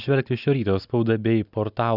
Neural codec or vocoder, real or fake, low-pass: none; real; 5.4 kHz